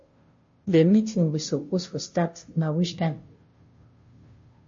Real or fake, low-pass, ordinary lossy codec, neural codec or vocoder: fake; 7.2 kHz; MP3, 32 kbps; codec, 16 kHz, 0.5 kbps, FunCodec, trained on Chinese and English, 25 frames a second